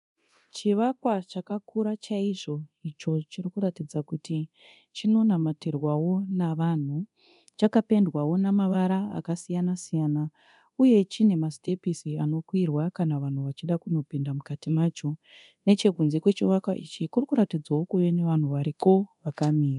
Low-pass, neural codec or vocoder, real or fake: 10.8 kHz; codec, 24 kHz, 0.9 kbps, DualCodec; fake